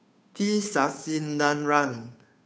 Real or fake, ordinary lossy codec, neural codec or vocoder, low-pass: fake; none; codec, 16 kHz, 2 kbps, FunCodec, trained on Chinese and English, 25 frames a second; none